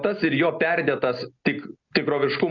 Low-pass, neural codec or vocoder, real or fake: 7.2 kHz; none; real